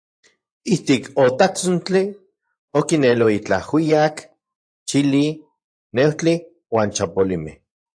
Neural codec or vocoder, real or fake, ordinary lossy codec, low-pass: vocoder, 24 kHz, 100 mel bands, Vocos; fake; AAC, 64 kbps; 9.9 kHz